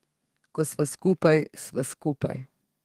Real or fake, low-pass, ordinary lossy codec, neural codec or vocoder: fake; 14.4 kHz; Opus, 24 kbps; codec, 32 kHz, 1.9 kbps, SNAC